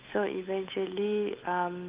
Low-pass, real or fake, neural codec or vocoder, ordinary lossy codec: 3.6 kHz; fake; codec, 16 kHz, 8 kbps, FunCodec, trained on Chinese and English, 25 frames a second; Opus, 32 kbps